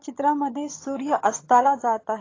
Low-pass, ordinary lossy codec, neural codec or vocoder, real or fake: 7.2 kHz; AAC, 32 kbps; vocoder, 22.05 kHz, 80 mel bands, HiFi-GAN; fake